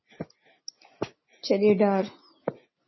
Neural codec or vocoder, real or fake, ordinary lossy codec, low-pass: none; real; MP3, 24 kbps; 7.2 kHz